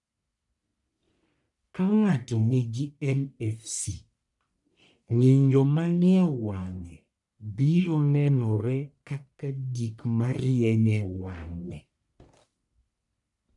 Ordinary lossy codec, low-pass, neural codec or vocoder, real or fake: none; 10.8 kHz; codec, 44.1 kHz, 1.7 kbps, Pupu-Codec; fake